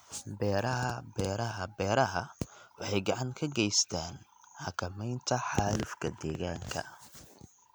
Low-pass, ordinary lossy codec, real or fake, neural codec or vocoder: none; none; real; none